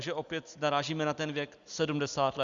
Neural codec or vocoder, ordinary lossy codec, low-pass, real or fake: none; Opus, 64 kbps; 7.2 kHz; real